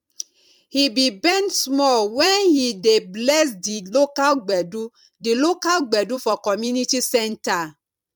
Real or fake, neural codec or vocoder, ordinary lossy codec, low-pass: fake; vocoder, 44.1 kHz, 128 mel bands every 256 samples, BigVGAN v2; MP3, 96 kbps; 19.8 kHz